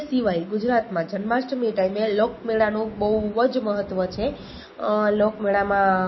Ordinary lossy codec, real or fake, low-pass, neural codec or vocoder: MP3, 24 kbps; real; 7.2 kHz; none